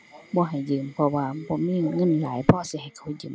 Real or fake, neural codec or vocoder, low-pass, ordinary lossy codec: real; none; none; none